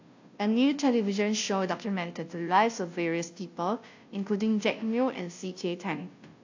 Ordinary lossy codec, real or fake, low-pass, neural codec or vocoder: AAC, 48 kbps; fake; 7.2 kHz; codec, 16 kHz, 0.5 kbps, FunCodec, trained on Chinese and English, 25 frames a second